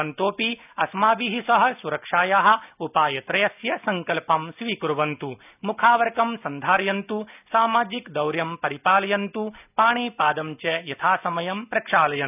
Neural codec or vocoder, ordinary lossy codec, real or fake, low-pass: none; none; real; 3.6 kHz